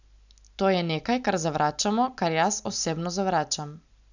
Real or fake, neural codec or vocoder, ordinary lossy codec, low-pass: real; none; none; 7.2 kHz